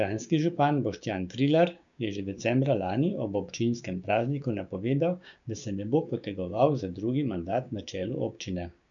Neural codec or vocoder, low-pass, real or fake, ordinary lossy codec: codec, 16 kHz, 6 kbps, DAC; 7.2 kHz; fake; MP3, 96 kbps